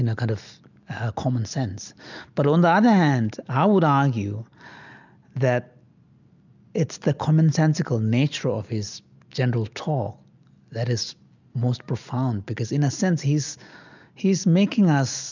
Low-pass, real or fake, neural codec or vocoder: 7.2 kHz; real; none